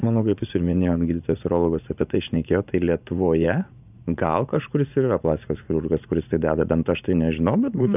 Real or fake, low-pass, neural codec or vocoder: fake; 3.6 kHz; vocoder, 44.1 kHz, 80 mel bands, Vocos